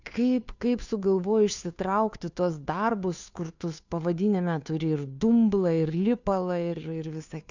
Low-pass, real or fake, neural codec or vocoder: 7.2 kHz; fake; codec, 16 kHz, 2 kbps, FunCodec, trained on Chinese and English, 25 frames a second